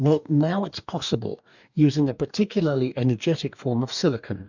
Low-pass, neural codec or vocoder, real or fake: 7.2 kHz; codec, 44.1 kHz, 2.6 kbps, DAC; fake